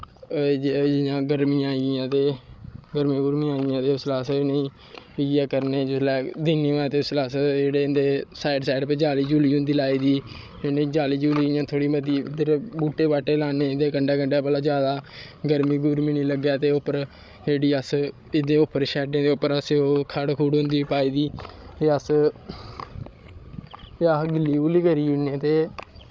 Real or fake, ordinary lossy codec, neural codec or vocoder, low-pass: fake; none; codec, 16 kHz, 16 kbps, FreqCodec, larger model; none